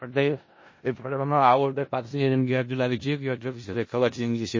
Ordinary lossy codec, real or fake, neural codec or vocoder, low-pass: MP3, 32 kbps; fake; codec, 16 kHz in and 24 kHz out, 0.4 kbps, LongCat-Audio-Codec, four codebook decoder; 7.2 kHz